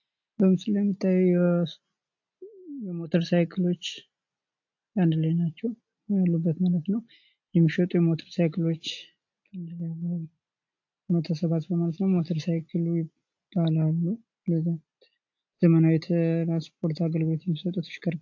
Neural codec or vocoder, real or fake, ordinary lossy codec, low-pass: none; real; AAC, 48 kbps; 7.2 kHz